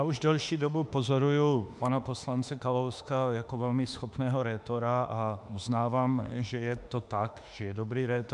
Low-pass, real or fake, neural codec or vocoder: 10.8 kHz; fake; autoencoder, 48 kHz, 32 numbers a frame, DAC-VAE, trained on Japanese speech